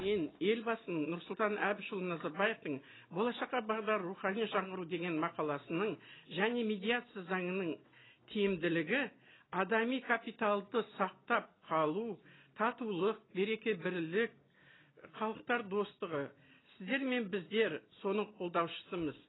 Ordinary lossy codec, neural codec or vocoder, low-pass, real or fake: AAC, 16 kbps; none; 7.2 kHz; real